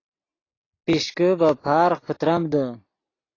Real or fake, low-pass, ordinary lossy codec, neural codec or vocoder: real; 7.2 kHz; AAC, 32 kbps; none